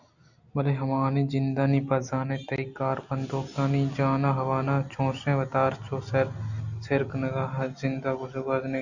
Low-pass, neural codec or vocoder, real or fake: 7.2 kHz; none; real